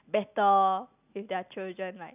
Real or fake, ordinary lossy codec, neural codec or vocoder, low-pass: real; none; none; 3.6 kHz